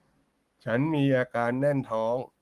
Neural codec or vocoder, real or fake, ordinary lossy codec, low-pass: codec, 44.1 kHz, 7.8 kbps, Pupu-Codec; fake; Opus, 24 kbps; 14.4 kHz